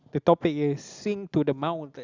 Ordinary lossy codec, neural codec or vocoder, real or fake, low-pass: Opus, 64 kbps; none; real; 7.2 kHz